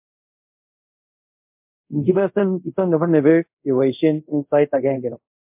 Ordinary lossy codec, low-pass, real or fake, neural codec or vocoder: MP3, 32 kbps; 3.6 kHz; fake; codec, 24 kHz, 0.5 kbps, DualCodec